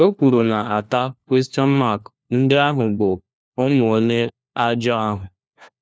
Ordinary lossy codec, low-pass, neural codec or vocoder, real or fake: none; none; codec, 16 kHz, 1 kbps, FunCodec, trained on LibriTTS, 50 frames a second; fake